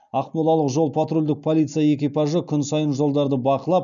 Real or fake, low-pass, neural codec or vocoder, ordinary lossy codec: real; 7.2 kHz; none; none